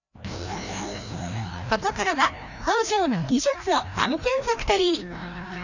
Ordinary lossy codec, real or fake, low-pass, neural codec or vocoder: none; fake; 7.2 kHz; codec, 16 kHz, 1 kbps, FreqCodec, larger model